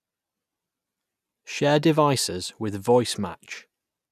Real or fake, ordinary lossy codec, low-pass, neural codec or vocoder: real; none; 14.4 kHz; none